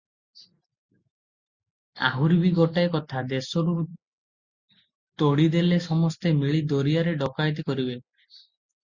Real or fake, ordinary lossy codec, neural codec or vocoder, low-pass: real; Opus, 64 kbps; none; 7.2 kHz